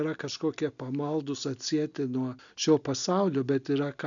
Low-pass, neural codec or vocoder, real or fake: 7.2 kHz; none; real